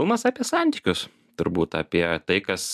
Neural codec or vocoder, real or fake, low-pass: none; real; 14.4 kHz